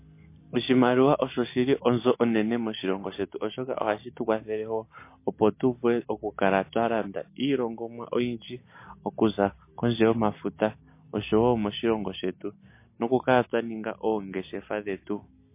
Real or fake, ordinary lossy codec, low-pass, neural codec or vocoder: real; MP3, 24 kbps; 3.6 kHz; none